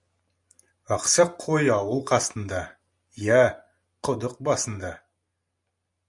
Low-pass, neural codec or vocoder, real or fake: 10.8 kHz; none; real